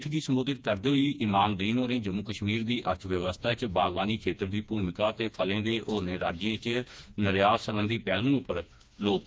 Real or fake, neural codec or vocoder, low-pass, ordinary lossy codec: fake; codec, 16 kHz, 2 kbps, FreqCodec, smaller model; none; none